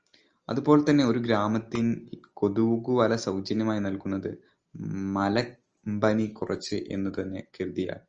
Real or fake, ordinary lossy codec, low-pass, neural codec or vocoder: real; Opus, 24 kbps; 7.2 kHz; none